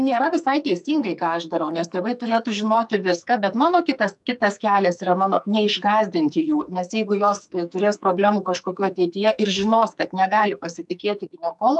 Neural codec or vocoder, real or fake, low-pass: codec, 44.1 kHz, 2.6 kbps, SNAC; fake; 10.8 kHz